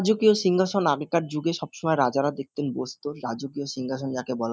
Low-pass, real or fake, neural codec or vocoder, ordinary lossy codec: none; real; none; none